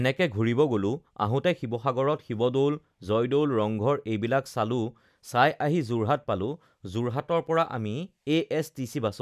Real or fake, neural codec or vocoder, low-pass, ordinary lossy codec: real; none; 14.4 kHz; none